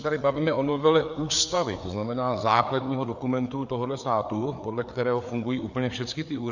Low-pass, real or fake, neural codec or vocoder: 7.2 kHz; fake; codec, 16 kHz, 4 kbps, FreqCodec, larger model